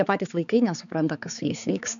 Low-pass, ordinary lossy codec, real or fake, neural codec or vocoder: 7.2 kHz; MP3, 96 kbps; fake; codec, 16 kHz, 4 kbps, X-Codec, HuBERT features, trained on balanced general audio